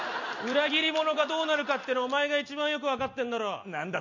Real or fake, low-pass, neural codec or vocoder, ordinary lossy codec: real; 7.2 kHz; none; none